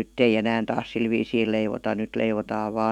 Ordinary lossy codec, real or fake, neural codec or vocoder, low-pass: none; fake; autoencoder, 48 kHz, 128 numbers a frame, DAC-VAE, trained on Japanese speech; 19.8 kHz